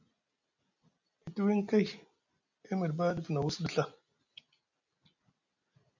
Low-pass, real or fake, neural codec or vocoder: 7.2 kHz; real; none